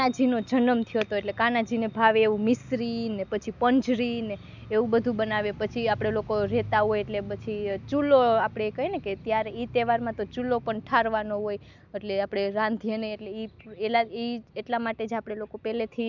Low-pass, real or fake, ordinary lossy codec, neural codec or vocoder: 7.2 kHz; real; none; none